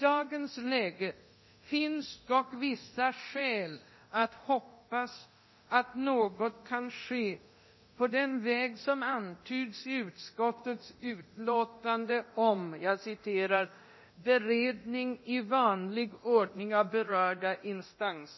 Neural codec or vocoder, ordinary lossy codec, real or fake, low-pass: codec, 24 kHz, 0.9 kbps, DualCodec; MP3, 24 kbps; fake; 7.2 kHz